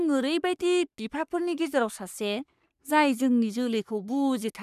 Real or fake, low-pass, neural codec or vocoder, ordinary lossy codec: fake; 14.4 kHz; codec, 44.1 kHz, 3.4 kbps, Pupu-Codec; none